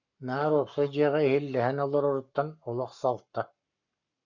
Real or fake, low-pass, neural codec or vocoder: fake; 7.2 kHz; codec, 44.1 kHz, 7.8 kbps, Pupu-Codec